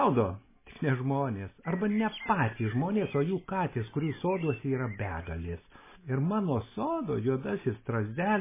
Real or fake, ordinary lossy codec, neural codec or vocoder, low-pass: real; MP3, 16 kbps; none; 3.6 kHz